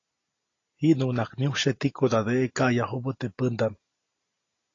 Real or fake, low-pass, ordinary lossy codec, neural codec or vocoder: real; 7.2 kHz; AAC, 32 kbps; none